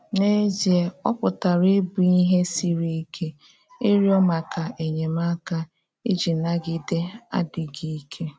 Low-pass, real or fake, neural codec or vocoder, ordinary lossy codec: none; real; none; none